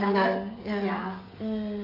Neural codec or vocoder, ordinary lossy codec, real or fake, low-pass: codec, 16 kHz, 16 kbps, FreqCodec, smaller model; AAC, 24 kbps; fake; 5.4 kHz